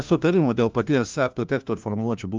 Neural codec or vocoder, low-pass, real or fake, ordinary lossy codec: codec, 16 kHz, 1 kbps, FunCodec, trained on LibriTTS, 50 frames a second; 7.2 kHz; fake; Opus, 32 kbps